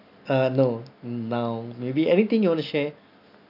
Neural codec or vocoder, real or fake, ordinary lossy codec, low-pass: none; real; none; 5.4 kHz